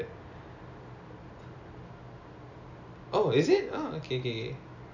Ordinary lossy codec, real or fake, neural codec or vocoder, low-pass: none; real; none; 7.2 kHz